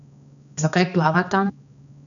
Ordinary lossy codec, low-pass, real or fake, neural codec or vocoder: none; 7.2 kHz; fake; codec, 16 kHz, 2 kbps, X-Codec, HuBERT features, trained on balanced general audio